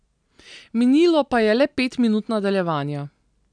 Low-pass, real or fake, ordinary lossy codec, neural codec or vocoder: 9.9 kHz; real; none; none